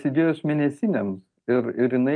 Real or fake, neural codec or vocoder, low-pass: real; none; 9.9 kHz